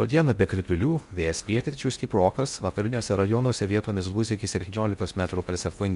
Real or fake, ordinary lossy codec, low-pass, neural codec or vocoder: fake; MP3, 64 kbps; 10.8 kHz; codec, 16 kHz in and 24 kHz out, 0.6 kbps, FocalCodec, streaming, 2048 codes